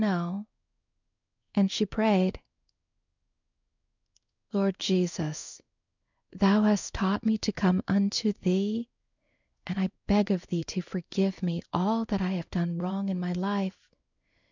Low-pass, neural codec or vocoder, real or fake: 7.2 kHz; codec, 16 kHz in and 24 kHz out, 1 kbps, XY-Tokenizer; fake